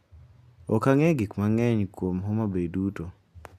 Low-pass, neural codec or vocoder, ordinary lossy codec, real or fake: 14.4 kHz; none; none; real